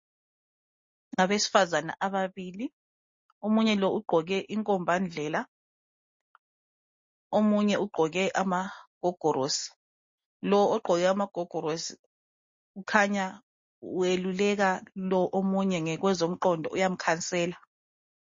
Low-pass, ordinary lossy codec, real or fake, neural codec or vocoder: 7.2 kHz; MP3, 32 kbps; real; none